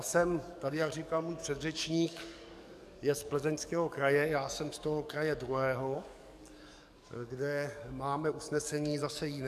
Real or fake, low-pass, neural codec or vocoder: fake; 14.4 kHz; codec, 44.1 kHz, 7.8 kbps, DAC